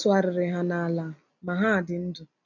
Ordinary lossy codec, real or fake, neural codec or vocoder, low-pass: none; real; none; 7.2 kHz